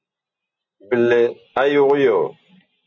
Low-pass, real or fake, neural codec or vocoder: 7.2 kHz; real; none